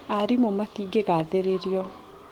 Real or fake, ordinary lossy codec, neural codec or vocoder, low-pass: fake; Opus, 16 kbps; autoencoder, 48 kHz, 128 numbers a frame, DAC-VAE, trained on Japanese speech; 19.8 kHz